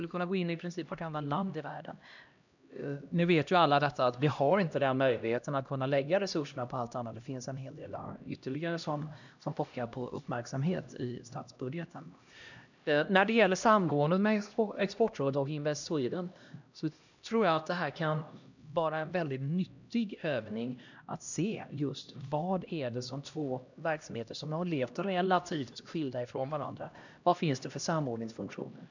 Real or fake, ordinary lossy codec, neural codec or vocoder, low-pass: fake; none; codec, 16 kHz, 1 kbps, X-Codec, HuBERT features, trained on LibriSpeech; 7.2 kHz